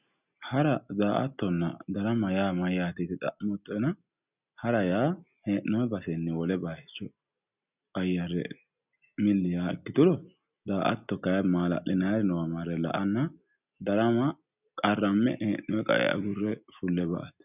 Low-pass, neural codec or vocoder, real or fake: 3.6 kHz; none; real